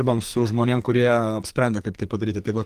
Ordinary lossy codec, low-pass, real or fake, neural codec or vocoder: Opus, 24 kbps; 14.4 kHz; fake; codec, 44.1 kHz, 2.6 kbps, SNAC